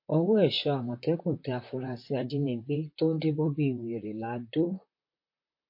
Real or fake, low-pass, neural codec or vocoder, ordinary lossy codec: fake; 5.4 kHz; vocoder, 44.1 kHz, 128 mel bands, Pupu-Vocoder; MP3, 32 kbps